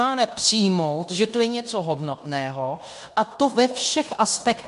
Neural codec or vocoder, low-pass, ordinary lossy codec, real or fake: codec, 16 kHz in and 24 kHz out, 0.9 kbps, LongCat-Audio-Codec, fine tuned four codebook decoder; 10.8 kHz; AAC, 96 kbps; fake